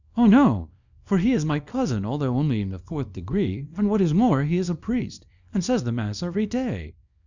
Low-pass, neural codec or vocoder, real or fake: 7.2 kHz; codec, 24 kHz, 0.9 kbps, WavTokenizer, small release; fake